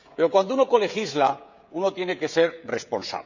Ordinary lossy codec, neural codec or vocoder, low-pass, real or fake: none; codec, 16 kHz, 16 kbps, FreqCodec, smaller model; 7.2 kHz; fake